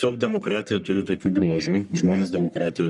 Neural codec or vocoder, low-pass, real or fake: codec, 44.1 kHz, 1.7 kbps, Pupu-Codec; 10.8 kHz; fake